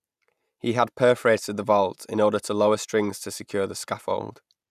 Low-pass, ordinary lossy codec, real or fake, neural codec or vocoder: 14.4 kHz; none; real; none